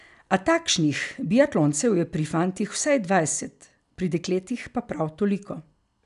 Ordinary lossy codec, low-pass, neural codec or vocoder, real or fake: none; 10.8 kHz; none; real